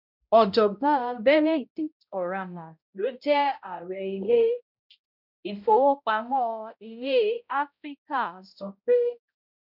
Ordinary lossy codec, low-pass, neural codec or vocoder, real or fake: none; 5.4 kHz; codec, 16 kHz, 0.5 kbps, X-Codec, HuBERT features, trained on balanced general audio; fake